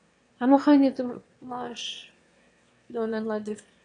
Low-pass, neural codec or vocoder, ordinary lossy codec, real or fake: 9.9 kHz; autoencoder, 22.05 kHz, a latent of 192 numbers a frame, VITS, trained on one speaker; AAC, 48 kbps; fake